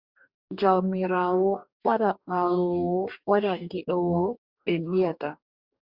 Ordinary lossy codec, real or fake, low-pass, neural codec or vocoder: Opus, 64 kbps; fake; 5.4 kHz; codec, 44.1 kHz, 2.6 kbps, DAC